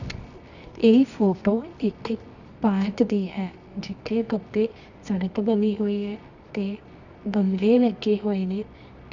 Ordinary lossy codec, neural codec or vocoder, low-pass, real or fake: none; codec, 24 kHz, 0.9 kbps, WavTokenizer, medium music audio release; 7.2 kHz; fake